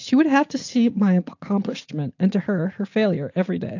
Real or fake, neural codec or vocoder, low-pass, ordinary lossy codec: real; none; 7.2 kHz; AAC, 48 kbps